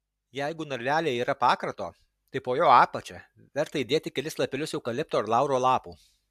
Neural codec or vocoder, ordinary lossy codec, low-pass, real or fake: none; AAC, 96 kbps; 14.4 kHz; real